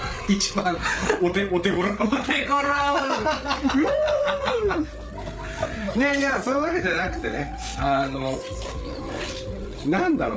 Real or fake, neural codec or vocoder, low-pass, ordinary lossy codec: fake; codec, 16 kHz, 8 kbps, FreqCodec, larger model; none; none